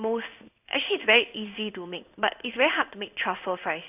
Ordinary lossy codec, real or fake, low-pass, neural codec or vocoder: none; fake; 3.6 kHz; codec, 16 kHz in and 24 kHz out, 1 kbps, XY-Tokenizer